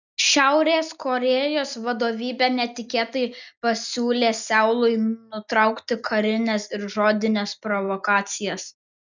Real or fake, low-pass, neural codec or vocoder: real; 7.2 kHz; none